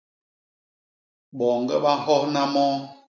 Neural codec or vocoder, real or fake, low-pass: none; real; 7.2 kHz